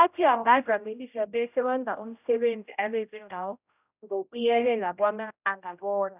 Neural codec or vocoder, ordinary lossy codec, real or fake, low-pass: codec, 16 kHz, 0.5 kbps, X-Codec, HuBERT features, trained on general audio; none; fake; 3.6 kHz